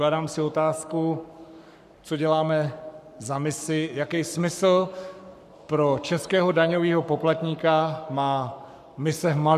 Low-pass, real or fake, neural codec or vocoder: 14.4 kHz; fake; codec, 44.1 kHz, 7.8 kbps, Pupu-Codec